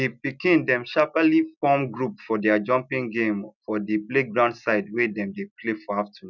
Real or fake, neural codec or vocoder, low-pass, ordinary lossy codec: real; none; 7.2 kHz; none